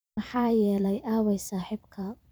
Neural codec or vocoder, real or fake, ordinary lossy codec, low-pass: vocoder, 44.1 kHz, 128 mel bands every 512 samples, BigVGAN v2; fake; none; none